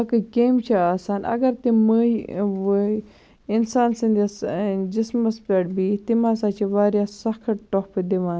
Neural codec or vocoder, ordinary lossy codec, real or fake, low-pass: none; none; real; none